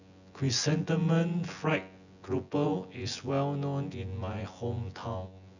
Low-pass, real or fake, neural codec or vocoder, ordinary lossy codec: 7.2 kHz; fake; vocoder, 24 kHz, 100 mel bands, Vocos; none